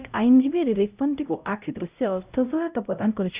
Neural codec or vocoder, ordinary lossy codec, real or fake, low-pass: codec, 16 kHz, 0.5 kbps, X-Codec, HuBERT features, trained on LibriSpeech; Opus, 64 kbps; fake; 3.6 kHz